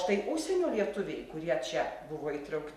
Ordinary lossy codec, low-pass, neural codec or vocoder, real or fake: MP3, 64 kbps; 14.4 kHz; none; real